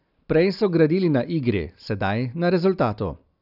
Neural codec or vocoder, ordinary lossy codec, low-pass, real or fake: none; none; 5.4 kHz; real